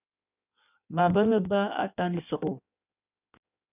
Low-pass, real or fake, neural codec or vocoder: 3.6 kHz; fake; codec, 16 kHz in and 24 kHz out, 1.1 kbps, FireRedTTS-2 codec